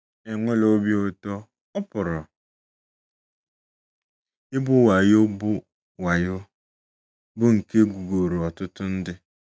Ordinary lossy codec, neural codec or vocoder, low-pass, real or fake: none; none; none; real